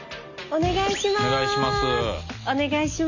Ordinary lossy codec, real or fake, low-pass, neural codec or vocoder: none; real; 7.2 kHz; none